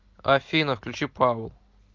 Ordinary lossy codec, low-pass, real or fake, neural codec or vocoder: Opus, 24 kbps; 7.2 kHz; real; none